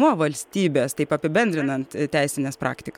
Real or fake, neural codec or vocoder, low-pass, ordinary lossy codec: real; none; 19.8 kHz; MP3, 96 kbps